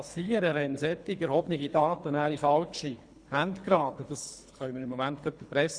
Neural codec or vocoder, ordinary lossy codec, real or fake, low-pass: codec, 24 kHz, 3 kbps, HILCodec; Opus, 64 kbps; fake; 9.9 kHz